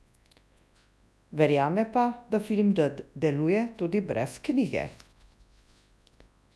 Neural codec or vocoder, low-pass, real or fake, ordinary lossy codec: codec, 24 kHz, 0.9 kbps, WavTokenizer, large speech release; none; fake; none